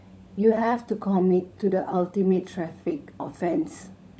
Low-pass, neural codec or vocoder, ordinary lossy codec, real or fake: none; codec, 16 kHz, 16 kbps, FunCodec, trained on LibriTTS, 50 frames a second; none; fake